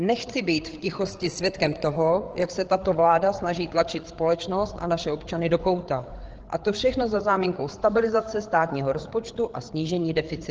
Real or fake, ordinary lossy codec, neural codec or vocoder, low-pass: fake; Opus, 16 kbps; codec, 16 kHz, 8 kbps, FreqCodec, larger model; 7.2 kHz